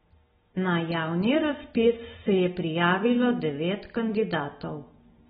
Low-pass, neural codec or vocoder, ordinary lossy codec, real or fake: 19.8 kHz; none; AAC, 16 kbps; real